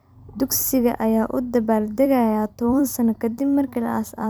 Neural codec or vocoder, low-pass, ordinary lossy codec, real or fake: vocoder, 44.1 kHz, 128 mel bands every 256 samples, BigVGAN v2; none; none; fake